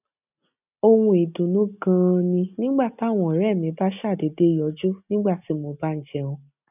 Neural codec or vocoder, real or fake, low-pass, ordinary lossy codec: none; real; 3.6 kHz; none